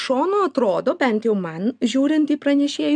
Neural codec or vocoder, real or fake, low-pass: none; real; 9.9 kHz